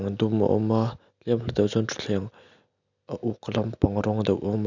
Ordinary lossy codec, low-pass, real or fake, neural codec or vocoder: none; 7.2 kHz; real; none